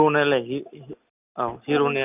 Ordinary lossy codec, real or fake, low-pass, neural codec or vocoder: none; real; 3.6 kHz; none